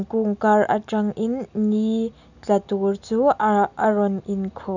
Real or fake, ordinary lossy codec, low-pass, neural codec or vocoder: real; none; 7.2 kHz; none